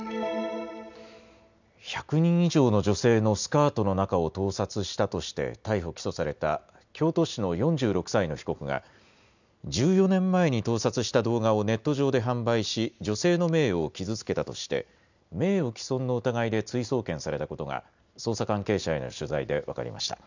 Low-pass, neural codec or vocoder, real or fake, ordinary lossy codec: 7.2 kHz; none; real; none